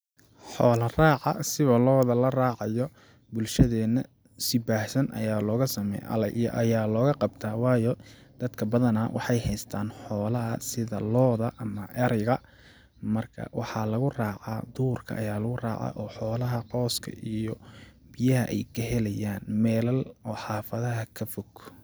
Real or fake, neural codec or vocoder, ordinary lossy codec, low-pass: real; none; none; none